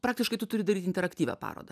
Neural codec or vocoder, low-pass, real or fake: none; 14.4 kHz; real